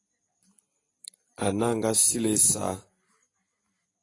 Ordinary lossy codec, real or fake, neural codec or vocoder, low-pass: AAC, 32 kbps; real; none; 10.8 kHz